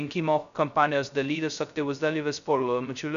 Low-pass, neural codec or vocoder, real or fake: 7.2 kHz; codec, 16 kHz, 0.2 kbps, FocalCodec; fake